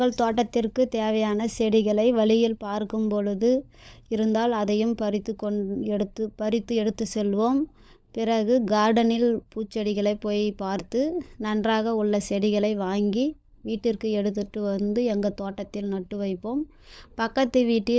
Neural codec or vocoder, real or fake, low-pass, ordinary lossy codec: codec, 16 kHz, 16 kbps, FunCodec, trained on LibriTTS, 50 frames a second; fake; none; none